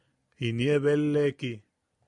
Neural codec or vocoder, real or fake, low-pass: none; real; 10.8 kHz